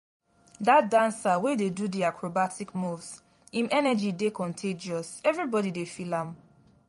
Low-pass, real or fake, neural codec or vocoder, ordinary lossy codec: 19.8 kHz; fake; vocoder, 44.1 kHz, 128 mel bands every 256 samples, BigVGAN v2; MP3, 48 kbps